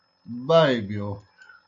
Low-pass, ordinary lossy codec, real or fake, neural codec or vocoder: 7.2 kHz; AAC, 64 kbps; real; none